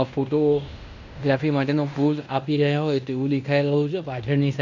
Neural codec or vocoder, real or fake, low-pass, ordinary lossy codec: codec, 16 kHz in and 24 kHz out, 0.9 kbps, LongCat-Audio-Codec, fine tuned four codebook decoder; fake; 7.2 kHz; none